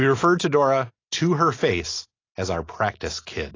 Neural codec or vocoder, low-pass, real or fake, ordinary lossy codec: none; 7.2 kHz; real; AAC, 32 kbps